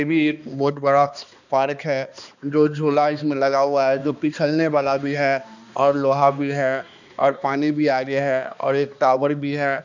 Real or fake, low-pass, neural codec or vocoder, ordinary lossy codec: fake; 7.2 kHz; codec, 16 kHz, 2 kbps, X-Codec, HuBERT features, trained on balanced general audio; none